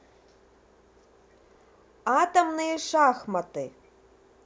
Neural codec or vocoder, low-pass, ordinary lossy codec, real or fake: none; none; none; real